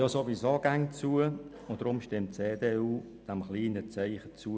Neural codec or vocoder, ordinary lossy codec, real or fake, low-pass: none; none; real; none